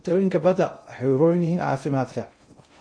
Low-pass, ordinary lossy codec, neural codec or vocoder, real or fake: 9.9 kHz; MP3, 48 kbps; codec, 16 kHz in and 24 kHz out, 0.6 kbps, FocalCodec, streaming, 2048 codes; fake